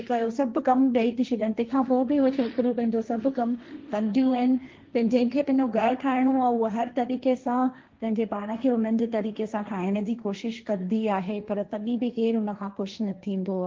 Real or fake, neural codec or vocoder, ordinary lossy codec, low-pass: fake; codec, 16 kHz, 1.1 kbps, Voila-Tokenizer; Opus, 32 kbps; 7.2 kHz